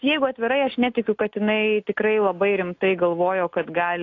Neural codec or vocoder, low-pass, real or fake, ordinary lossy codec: none; 7.2 kHz; real; AAC, 48 kbps